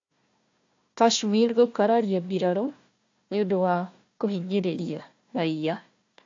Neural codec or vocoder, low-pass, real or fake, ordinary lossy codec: codec, 16 kHz, 1 kbps, FunCodec, trained on Chinese and English, 50 frames a second; 7.2 kHz; fake; AAC, 48 kbps